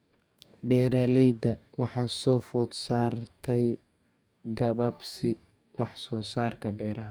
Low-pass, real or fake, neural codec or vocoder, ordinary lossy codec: none; fake; codec, 44.1 kHz, 2.6 kbps, DAC; none